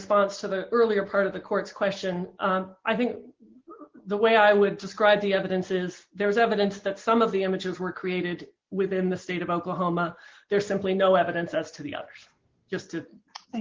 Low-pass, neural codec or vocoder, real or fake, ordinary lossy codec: 7.2 kHz; none; real; Opus, 16 kbps